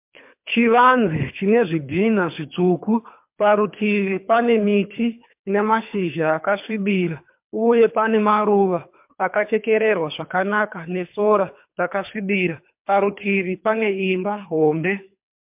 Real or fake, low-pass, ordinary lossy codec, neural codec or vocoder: fake; 3.6 kHz; MP3, 32 kbps; codec, 24 kHz, 3 kbps, HILCodec